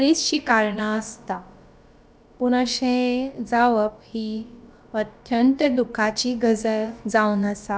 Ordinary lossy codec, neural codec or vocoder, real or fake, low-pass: none; codec, 16 kHz, about 1 kbps, DyCAST, with the encoder's durations; fake; none